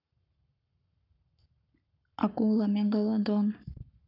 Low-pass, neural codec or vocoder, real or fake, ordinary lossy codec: 5.4 kHz; codec, 44.1 kHz, 7.8 kbps, Pupu-Codec; fake; none